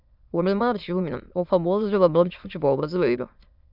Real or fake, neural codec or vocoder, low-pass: fake; autoencoder, 22.05 kHz, a latent of 192 numbers a frame, VITS, trained on many speakers; 5.4 kHz